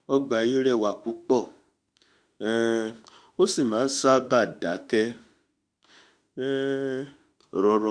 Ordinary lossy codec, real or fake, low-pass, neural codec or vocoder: Opus, 64 kbps; fake; 9.9 kHz; autoencoder, 48 kHz, 32 numbers a frame, DAC-VAE, trained on Japanese speech